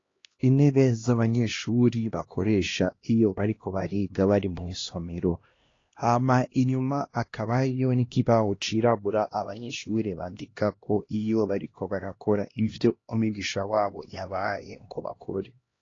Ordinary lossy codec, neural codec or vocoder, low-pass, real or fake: AAC, 32 kbps; codec, 16 kHz, 1 kbps, X-Codec, HuBERT features, trained on LibriSpeech; 7.2 kHz; fake